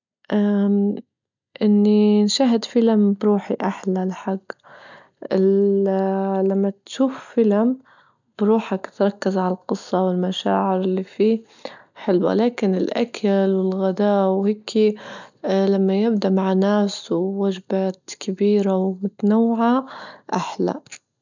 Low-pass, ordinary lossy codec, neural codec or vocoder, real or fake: 7.2 kHz; none; none; real